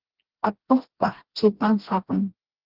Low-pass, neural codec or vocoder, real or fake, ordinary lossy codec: 5.4 kHz; codec, 16 kHz, 1 kbps, FreqCodec, smaller model; fake; Opus, 16 kbps